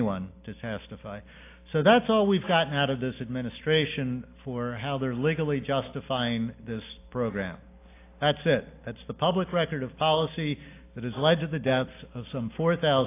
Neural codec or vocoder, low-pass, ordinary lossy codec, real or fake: none; 3.6 kHz; AAC, 24 kbps; real